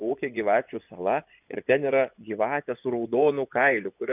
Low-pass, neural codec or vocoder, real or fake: 3.6 kHz; vocoder, 24 kHz, 100 mel bands, Vocos; fake